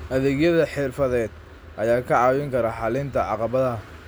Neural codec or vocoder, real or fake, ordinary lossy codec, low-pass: none; real; none; none